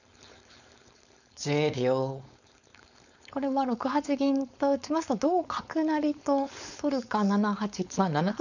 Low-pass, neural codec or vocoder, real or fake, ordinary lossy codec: 7.2 kHz; codec, 16 kHz, 4.8 kbps, FACodec; fake; none